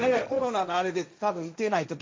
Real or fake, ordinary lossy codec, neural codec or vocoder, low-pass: fake; none; codec, 16 kHz, 1.1 kbps, Voila-Tokenizer; none